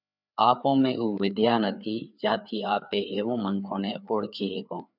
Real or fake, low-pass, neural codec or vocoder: fake; 5.4 kHz; codec, 16 kHz, 4 kbps, FreqCodec, larger model